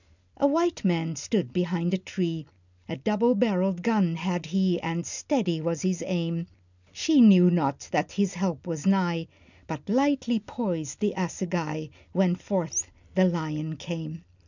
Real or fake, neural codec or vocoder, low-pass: real; none; 7.2 kHz